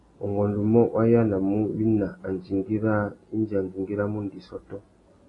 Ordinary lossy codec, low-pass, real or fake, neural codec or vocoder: AAC, 32 kbps; 10.8 kHz; real; none